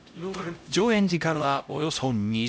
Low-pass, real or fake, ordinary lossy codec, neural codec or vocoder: none; fake; none; codec, 16 kHz, 0.5 kbps, X-Codec, HuBERT features, trained on LibriSpeech